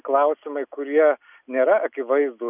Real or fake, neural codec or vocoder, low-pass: real; none; 3.6 kHz